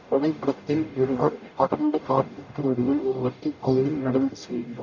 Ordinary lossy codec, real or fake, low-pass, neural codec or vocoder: none; fake; 7.2 kHz; codec, 44.1 kHz, 0.9 kbps, DAC